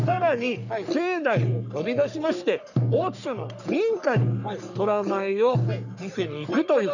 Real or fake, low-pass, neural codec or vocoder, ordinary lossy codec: fake; 7.2 kHz; codec, 44.1 kHz, 3.4 kbps, Pupu-Codec; none